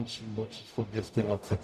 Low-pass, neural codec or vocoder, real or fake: 14.4 kHz; codec, 44.1 kHz, 0.9 kbps, DAC; fake